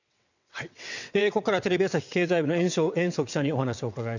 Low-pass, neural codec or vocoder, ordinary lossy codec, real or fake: 7.2 kHz; vocoder, 22.05 kHz, 80 mel bands, WaveNeXt; none; fake